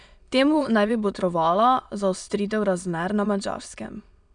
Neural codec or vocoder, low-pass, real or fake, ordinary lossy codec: autoencoder, 22.05 kHz, a latent of 192 numbers a frame, VITS, trained on many speakers; 9.9 kHz; fake; none